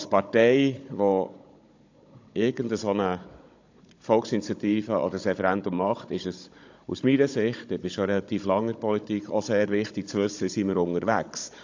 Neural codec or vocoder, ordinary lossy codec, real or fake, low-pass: codec, 16 kHz, 16 kbps, FunCodec, trained on Chinese and English, 50 frames a second; AAC, 48 kbps; fake; 7.2 kHz